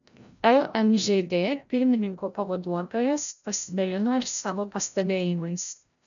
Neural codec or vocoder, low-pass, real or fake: codec, 16 kHz, 0.5 kbps, FreqCodec, larger model; 7.2 kHz; fake